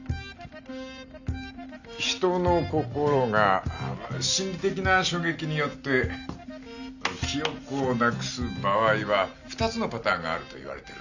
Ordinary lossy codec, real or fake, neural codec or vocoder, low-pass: none; real; none; 7.2 kHz